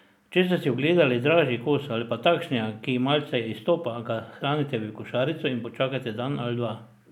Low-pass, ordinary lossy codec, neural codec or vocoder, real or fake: 19.8 kHz; none; none; real